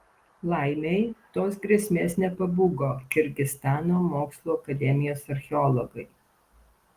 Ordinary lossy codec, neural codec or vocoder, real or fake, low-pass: Opus, 24 kbps; none; real; 14.4 kHz